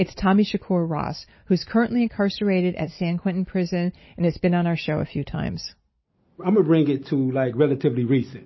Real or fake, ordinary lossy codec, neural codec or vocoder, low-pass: real; MP3, 24 kbps; none; 7.2 kHz